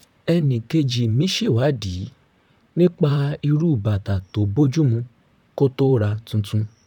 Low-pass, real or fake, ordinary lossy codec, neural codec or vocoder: 19.8 kHz; fake; none; vocoder, 44.1 kHz, 128 mel bands, Pupu-Vocoder